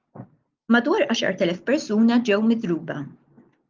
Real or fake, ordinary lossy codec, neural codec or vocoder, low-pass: real; Opus, 24 kbps; none; 7.2 kHz